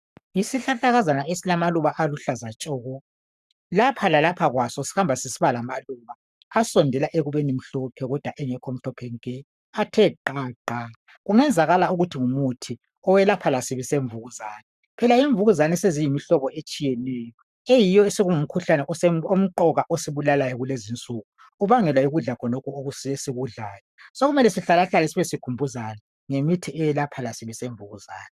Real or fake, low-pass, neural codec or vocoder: fake; 14.4 kHz; codec, 44.1 kHz, 7.8 kbps, Pupu-Codec